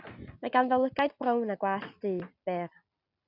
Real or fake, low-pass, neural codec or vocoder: real; 5.4 kHz; none